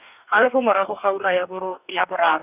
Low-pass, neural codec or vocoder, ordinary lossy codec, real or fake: 3.6 kHz; codec, 44.1 kHz, 2.6 kbps, DAC; none; fake